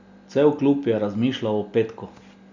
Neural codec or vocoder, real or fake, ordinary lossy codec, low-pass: none; real; Opus, 64 kbps; 7.2 kHz